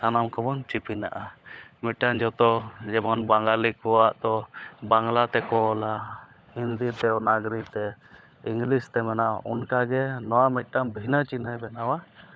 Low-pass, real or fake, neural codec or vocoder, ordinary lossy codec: none; fake; codec, 16 kHz, 16 kbps, FunCodec, trained on LibriTTS, 50 frames a second; none